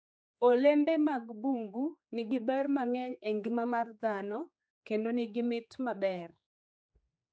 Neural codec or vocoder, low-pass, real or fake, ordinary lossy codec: codec, 16 kHz, 4 kbps, X-Codec, HuBERT features, trained on general audio; none; fake; none